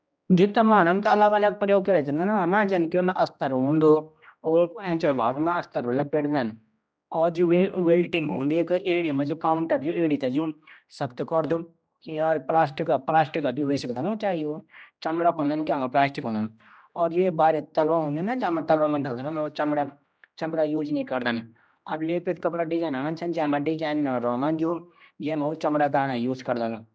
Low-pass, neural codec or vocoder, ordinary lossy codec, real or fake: none; codec, 16 kHz, 1 kbps, X-Codec, HuBERT features, trained on general audio; none; fake